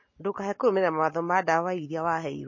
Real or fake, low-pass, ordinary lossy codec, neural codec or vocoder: real; 7.2 kHz; MP3, 32 kbps; none